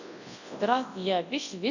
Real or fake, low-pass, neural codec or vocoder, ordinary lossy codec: fake; 7.2 kHz; codec, 24 kHz, 0.9 kbps, WavTokenizer, large speech release; none